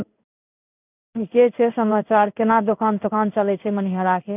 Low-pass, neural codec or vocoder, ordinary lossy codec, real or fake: 3.6 kHz; codec, 16 kHz in and 24 kHz out, 1 kbps, XY-Tokenizer; none; fake